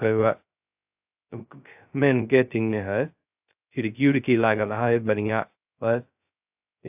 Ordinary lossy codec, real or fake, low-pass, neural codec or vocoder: AAC, 32 kbps; fake; 3.6 kHz; codec, 16 kHz, 0.2 kbps, FocalCodec